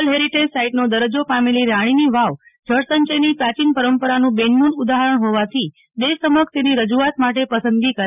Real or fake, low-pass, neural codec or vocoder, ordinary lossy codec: real; 3.6 kHz; none; none